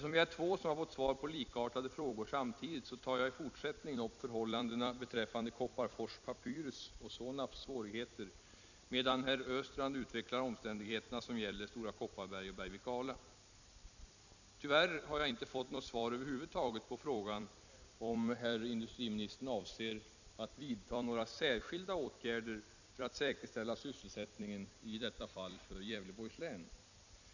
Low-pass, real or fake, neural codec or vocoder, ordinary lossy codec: 7.2 kHz; fake; vocoder, 44.1 kHz, 128 mel bands every 256 samples, BigVGAN v2; none